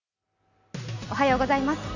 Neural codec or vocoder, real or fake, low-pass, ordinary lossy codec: none; real; 7.2 kHz; none